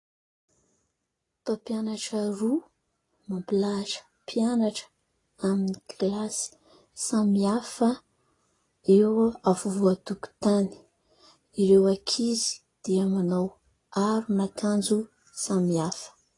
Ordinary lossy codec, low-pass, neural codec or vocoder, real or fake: AAC, 32 kbps; 10.8 kHz; none; real